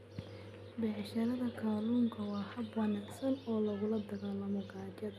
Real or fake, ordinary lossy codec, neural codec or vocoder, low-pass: real; none; none; 14.4 kHz